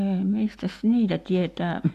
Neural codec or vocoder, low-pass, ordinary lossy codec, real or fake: none; 14.4 kHz; AAC, 64 kbps; real